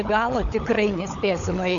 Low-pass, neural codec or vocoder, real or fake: 7.2 kHz; codec, 16 kHz, 16 kbps, FunCodec, trained on LibriTTS, 50 frames a second; fake